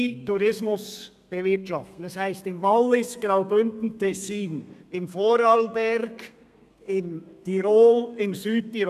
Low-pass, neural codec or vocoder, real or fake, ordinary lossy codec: 14.4 kHz; codec, 32 kHz, 1.9 kbps, SNAC; fake; none